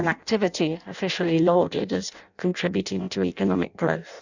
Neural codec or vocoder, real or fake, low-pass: codec, 16 kHz in and 24 kHz out, 0.6 kbps, FireRedTTS-2 codec; fake; 7.2 kHz